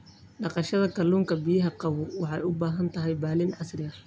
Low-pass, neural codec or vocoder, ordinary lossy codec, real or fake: none; none; none; real